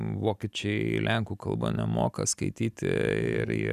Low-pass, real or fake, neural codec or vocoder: 14.4 kHz; real; none